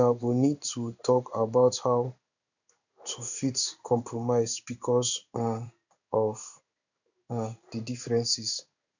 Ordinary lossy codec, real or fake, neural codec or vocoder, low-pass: none; fake; codec, 16 kHz in and 24 kHz out, 1 kbps, XY-Tokenizer; 7.2 kHz